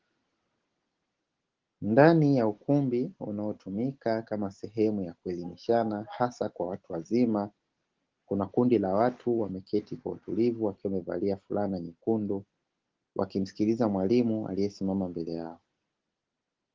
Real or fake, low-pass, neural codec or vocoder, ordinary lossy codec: real; 7.2 kHz; none; Opus, 16 kbps